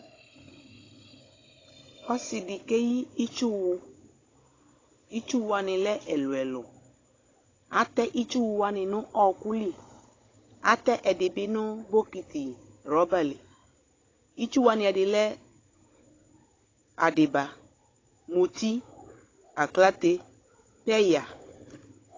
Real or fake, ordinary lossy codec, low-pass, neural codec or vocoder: fake; AAC, 32 kbps; 7.2 kHz; codec, 16 kHz, 16 kbps, FunCodec, trained on Chinese and English, 50 frames a second